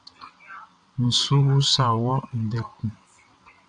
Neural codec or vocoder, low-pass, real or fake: vocoder, 22.05 kHz, 80 mel bands, WaveNeXt; 9.9 kHz; fake